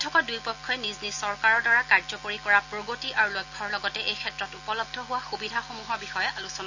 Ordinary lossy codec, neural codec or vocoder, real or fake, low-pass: none; none; real; 7.2 kHz